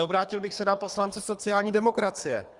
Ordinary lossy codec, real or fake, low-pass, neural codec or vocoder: Opus, 64 kbps; fake; 10.8 kHz; codec, 24 kHz, 3 kbps, HILCodec